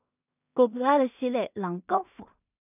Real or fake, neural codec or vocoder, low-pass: fake; codec, 16 kHz in and 24 kHz out, 0.4 kbps, LongCat-Audio-Codec, two codebook decoder; 3.6 kHz